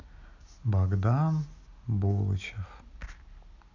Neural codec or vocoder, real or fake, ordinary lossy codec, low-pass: none; real; none; 7.2 kHz